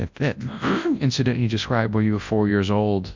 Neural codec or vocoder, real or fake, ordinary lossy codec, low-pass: codec, 24 kHz, 0.9 kbps, WavTokenizer, large speech release; fake; MP3, 64 kbps; 7.2 kHz